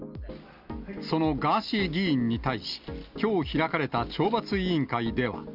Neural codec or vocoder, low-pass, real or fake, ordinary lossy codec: none; 5.4 kHz; real; Opus, 64 kbps